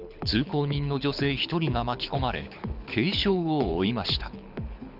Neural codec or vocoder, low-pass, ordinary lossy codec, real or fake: codec, 24 kHz, 6 kbps, HILCodec; 5.4 kHz; none; fake